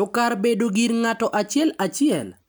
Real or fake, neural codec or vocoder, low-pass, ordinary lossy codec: real; none; none; none